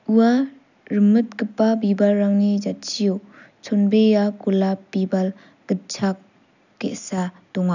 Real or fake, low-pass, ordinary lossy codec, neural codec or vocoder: real; 7.2 kHz; none; none